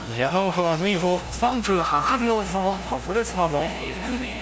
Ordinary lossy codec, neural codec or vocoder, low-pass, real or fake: none; codec, 16 kHz, 0.5 kbps, FunCodec, trained on LibriTTS, 25 frames a second; none; fake